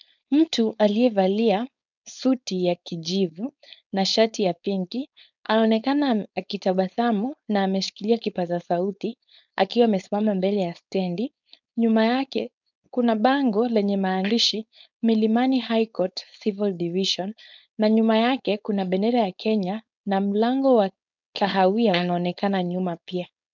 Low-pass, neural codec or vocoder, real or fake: 7.2 kHz; codec, 16 kHz, 4.8 kbps, FACodec; fake